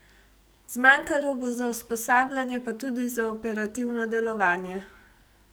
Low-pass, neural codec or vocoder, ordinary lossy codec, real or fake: none; codec, 44.1 kHz, 2.6 kbps, SNAC; none; fake